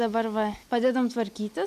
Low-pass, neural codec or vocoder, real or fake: 14.4 kHz; none; real